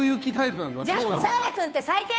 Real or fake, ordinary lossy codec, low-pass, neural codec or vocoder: fake; none; none; codec, 16 kHz, 2 kbps, FunCodec, trained on Chinese and English, 25 frames a second